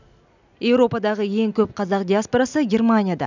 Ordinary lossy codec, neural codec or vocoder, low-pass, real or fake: none; none; 7.2 kHz; real